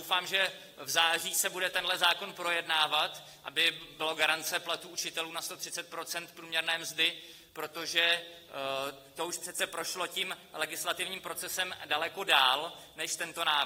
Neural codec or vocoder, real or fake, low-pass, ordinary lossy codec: none; real; 19.8 kHz; AAC, 48 kbps